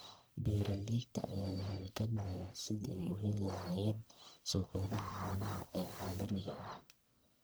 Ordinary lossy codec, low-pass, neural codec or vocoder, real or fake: none; none; codec, 44.1 kHz, 1.7 kbps, Pupu-Codec; fake